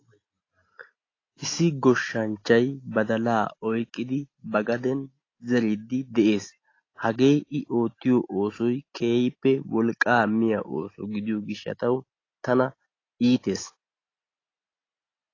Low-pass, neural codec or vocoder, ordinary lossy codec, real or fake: 7.2 kHz; none; AAC, 32 kbps; real